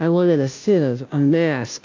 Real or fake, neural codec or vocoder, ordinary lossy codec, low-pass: fake; codec, 16 kHz, 0.5 kbps, FunCodec, trained on Chinese and English, 25 frames a second; AAC, 48 kbps; 7.2 kHz